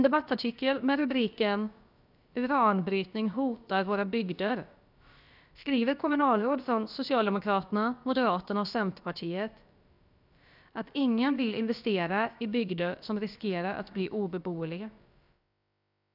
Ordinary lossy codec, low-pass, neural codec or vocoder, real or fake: none; 5.4 kHz; codec, 16 kHz, about 1 kbps, DyCAST, with the encoder's durations; fake